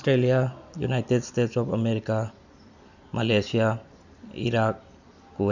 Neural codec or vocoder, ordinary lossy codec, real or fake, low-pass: none; none; real; 7.2 kHz